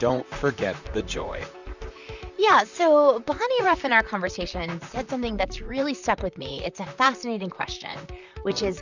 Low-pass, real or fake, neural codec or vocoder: 7.2 kHz; fake; vocoder, 44.1 kHz, 128 mel bands, Pupu-Vocoder